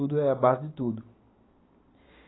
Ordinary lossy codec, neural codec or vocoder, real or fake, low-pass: AAC, 16 kbps; none; real; 7.2 kHz